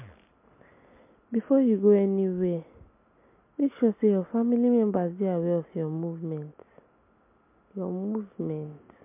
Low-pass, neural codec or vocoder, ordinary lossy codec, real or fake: 3.6 kHz; none; MP3, 24 kbps; real